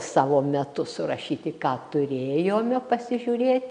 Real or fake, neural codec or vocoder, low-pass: real; none; 9.9 kHz